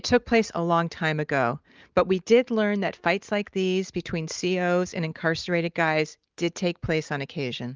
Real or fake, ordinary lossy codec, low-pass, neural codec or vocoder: real; Opus, 24 kbps; 7.2 kHz; none